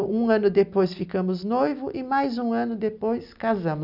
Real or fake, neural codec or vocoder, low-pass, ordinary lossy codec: real; none; 5.4 kHz; none